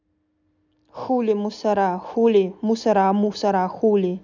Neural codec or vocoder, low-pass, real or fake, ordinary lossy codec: none; 7.2 kHz; real; none